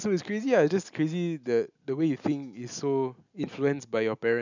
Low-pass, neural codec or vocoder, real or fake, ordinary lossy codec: 7.2 kHz; none; real; none